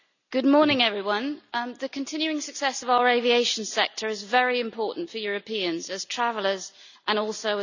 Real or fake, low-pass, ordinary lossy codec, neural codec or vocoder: real; 7.2 kHz; none; none